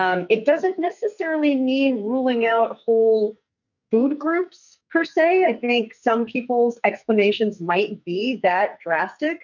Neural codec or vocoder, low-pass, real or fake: codec, 44.1 kHz, 2.6 kbps, SNAC; 7.2 kHz; fake